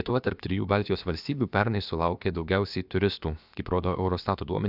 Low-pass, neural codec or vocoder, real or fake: 5.4 kHz; codec, 16 kHz, about 1 kbps, DyCAST, with the encoder's durations; fake